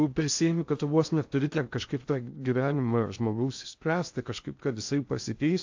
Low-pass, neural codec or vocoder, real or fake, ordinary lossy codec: 7.2 kHz; codec, 16 kHz in and 24 kHz out, 0.6 kbps, FocalCodec, streaming, 2048 codes; fake; AAC, 48 kbps